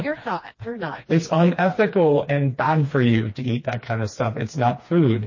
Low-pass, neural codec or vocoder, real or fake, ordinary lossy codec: 7.2 kHz; codec, 16 kHz, 2 kbps, FreqCodec, smaller model; fake; MP3, 32 kbps